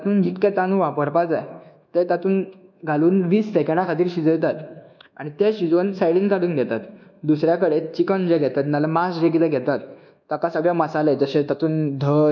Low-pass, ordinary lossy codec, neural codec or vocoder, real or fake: 7.2 kHz; none; codec, 24 kHz, 1.2 kbps, DualCodec; fake